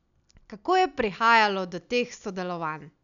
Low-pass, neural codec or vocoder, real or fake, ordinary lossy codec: 7.2 kHz; none; real; none